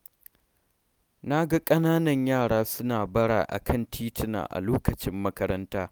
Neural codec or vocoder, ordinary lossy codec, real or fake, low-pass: none; none; real; none